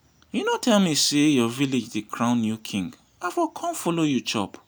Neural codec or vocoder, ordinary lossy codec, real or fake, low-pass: none; none; real; none